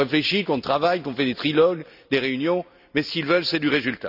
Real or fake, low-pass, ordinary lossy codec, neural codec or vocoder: real; 5.4 kHz; none; none